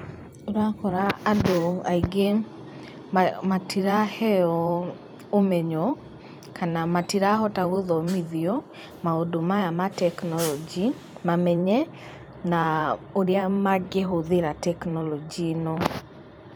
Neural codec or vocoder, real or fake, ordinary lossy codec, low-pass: vocoder, 44.1 kHz, 128 mel bands every 512 samples, BigVGAN v2; fake; none; none